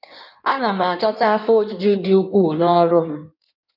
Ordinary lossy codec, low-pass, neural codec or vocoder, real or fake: none; 5.4 kHz; codec, 16 kHz in and 24 kHz out, 1.1 kbps, FireRedTTS-2 codec; fake